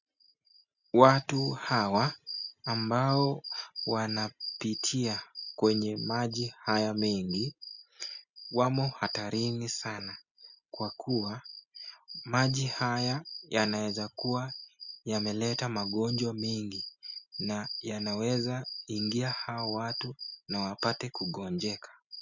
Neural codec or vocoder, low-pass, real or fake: none; 7.2 kHz; real